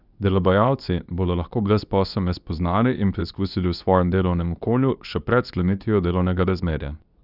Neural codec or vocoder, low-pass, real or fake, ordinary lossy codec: codec, 24 kHz, 0.9 kbps, WavTokenizer, medium speech release version 2; 5.4 kHz; fake; none